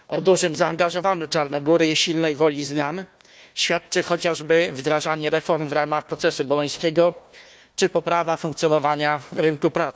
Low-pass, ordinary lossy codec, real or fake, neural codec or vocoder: none; none; fake; codec, 16 kHz, 1 kbps, FunCodec, trained on Chinese and English, 50 frames a second